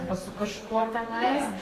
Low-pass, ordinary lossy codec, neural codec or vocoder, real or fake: 14.4 kHz; AAC, 48 kbps; codec, 32 kHz, 1.9 kbps, SNAC; fake